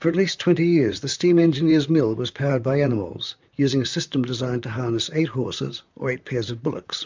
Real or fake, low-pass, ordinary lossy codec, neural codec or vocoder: fake; 7.2 kHz; MP3, 64 kbps; vocoder, 44.1 kHz, 128 mel bands, Pupu-Vocoder